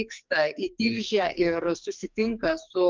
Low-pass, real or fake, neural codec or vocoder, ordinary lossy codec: 7.2 kHz; fake; codec, 44.1 kHz, 2.6 kbps, SNAC; Opus, 24 kbps